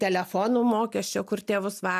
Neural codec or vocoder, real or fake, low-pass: none; real; 14.4 kHz